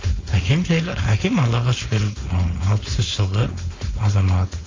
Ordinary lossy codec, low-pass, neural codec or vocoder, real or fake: AAC, 32 kbps; 7.2 kHz; codec, 16 kHz, 4.8 kbps, FACodec; fake